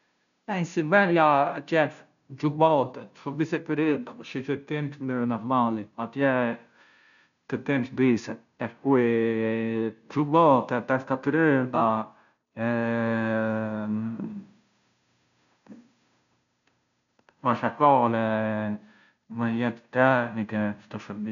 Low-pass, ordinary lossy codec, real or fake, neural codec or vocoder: 7.2 kHz; none; fake; codec, 16 kHz, 0.5 kbps, FunCodec, trained on Chinese and English, 25 frames a second